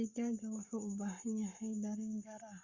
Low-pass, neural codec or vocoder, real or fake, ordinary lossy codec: 7.2 kHz; codec, 44.1 kHz, 7.8 kbps, Pupu-Codec; fake; Opus, 64 kbps